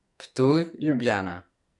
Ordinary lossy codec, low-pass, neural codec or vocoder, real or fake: none; 10.8 kHz; codec, 44.1 kHz, 2.6 kbps, SNAC; fake